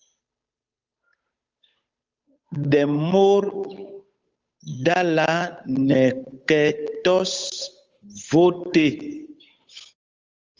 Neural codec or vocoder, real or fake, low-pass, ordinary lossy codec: codec, 16 kHz, 8 kbps, FunCodec, trained on Chinese and English, 25 frames a second; fake; 7.2 kHz; Opus, 32 kbps